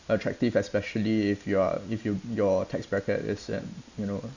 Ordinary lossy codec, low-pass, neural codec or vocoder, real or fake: none; 7.2 kHz; none; real